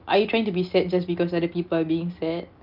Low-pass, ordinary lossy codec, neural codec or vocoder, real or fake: 5.4 kHz; Opus, 24 kbps; none; real